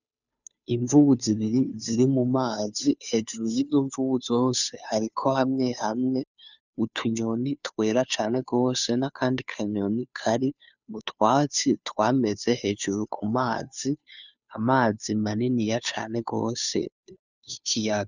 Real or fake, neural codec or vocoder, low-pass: fake; codec, 16 kHz, 2 kbps, FunCodec, trained on Chinese and English, 25 frames a second; 7.2 kHz